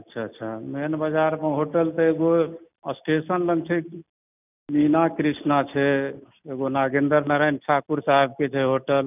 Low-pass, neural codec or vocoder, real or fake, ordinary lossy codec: 3.6 kHz; none; real; none